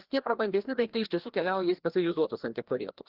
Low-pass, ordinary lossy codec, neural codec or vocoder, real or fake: 5.4 kHz; Opus, 16 kbps; codec, 16 kHz, 1 kbps, FreqCodec, larger model; fake